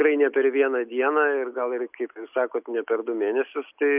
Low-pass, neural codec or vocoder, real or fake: 3.6 kHz; none; real